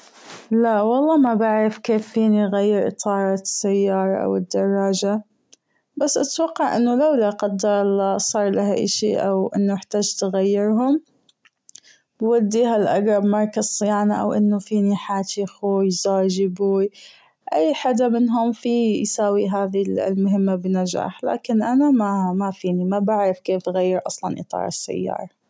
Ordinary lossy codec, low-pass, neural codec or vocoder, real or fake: none; none; none; real